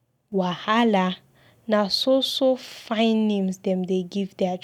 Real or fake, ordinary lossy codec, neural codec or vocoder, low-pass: real; none; none; 19.8 kHz